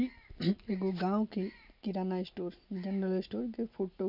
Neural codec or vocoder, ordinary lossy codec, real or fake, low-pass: none; none; real; 5.4 kHz